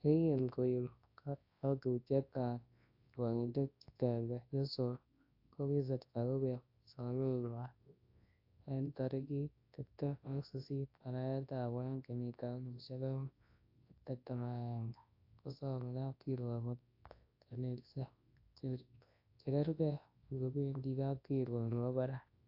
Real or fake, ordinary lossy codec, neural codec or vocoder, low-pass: fake; AAC, 32 kbps; codec, 24 kHz, 0.9 kbps, WavTokenizer, large speech release; 5.4 kHz